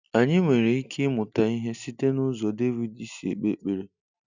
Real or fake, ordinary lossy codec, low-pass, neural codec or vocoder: real; none; 7.2 kHz; none